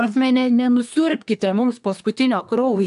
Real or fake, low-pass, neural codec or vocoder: fake; 10.8 kHz; codec, 24 kHz, 1 kbps, SNAC